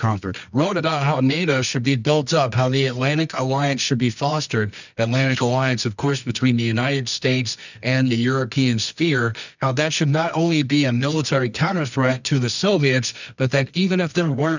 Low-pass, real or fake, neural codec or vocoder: 7.2 kHz; fake; codec, 24 kHz, 0.9 kbps, WavTokenizer, medium music audio release